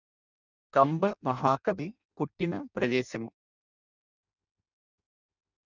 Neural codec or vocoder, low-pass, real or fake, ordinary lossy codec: codec, 16 kHz in and 24 kHz out, 0.6 kbps, FireRedTTS-2 codec; 7.2 kHz; fake; none